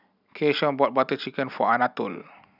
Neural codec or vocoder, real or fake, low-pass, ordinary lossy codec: none; real; 5.4 kHz; none